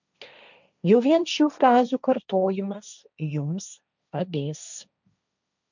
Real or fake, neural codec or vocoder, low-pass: fake; codec, 16 kHz, 1.1 kbps, Voila-Tokenizer; 7.2 kHz